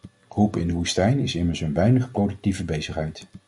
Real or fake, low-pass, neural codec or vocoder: real; 10.8 kHz; none